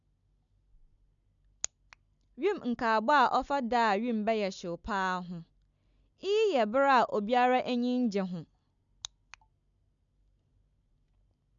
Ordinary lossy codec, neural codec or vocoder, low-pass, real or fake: MP3, 96 kbps; none; 7.2 kHz; real